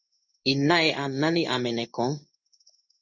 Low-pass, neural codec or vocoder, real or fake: 7.2 kHz; codec, 16 kHz in and 24 kHz out, 1 kbps, XY-Tokenizer; fake